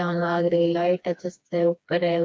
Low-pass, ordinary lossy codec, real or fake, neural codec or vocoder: none; none; fake; codec, 16 kHz, 2 kbps, FreqCodec, smaller model